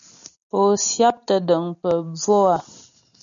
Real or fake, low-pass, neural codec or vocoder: real; 7.2 kHz; none